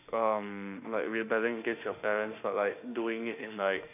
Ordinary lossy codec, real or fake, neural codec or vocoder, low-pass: none; fake; autoencoder, 48 kHz, 32 numbers a frame, DAC-VAE, trained on Japanese speech; 3.6 kHz